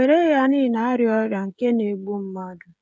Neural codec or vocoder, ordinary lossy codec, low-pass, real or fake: codec, 16 kHz, 16 kbps, FreqCodec, smaller model; none; none; fake